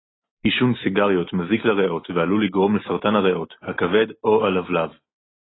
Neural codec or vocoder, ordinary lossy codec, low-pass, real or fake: none; AAC, 16 kbps; 7.2 kHz; real